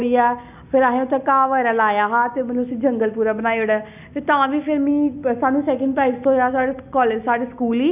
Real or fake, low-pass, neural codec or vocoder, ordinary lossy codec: real; 3.6 kHz; none; none